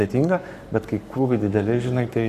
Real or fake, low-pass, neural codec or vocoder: fake; 14.4 kHz; codec, 44.1 kHz, 7.8 kbps, Pupu-Codec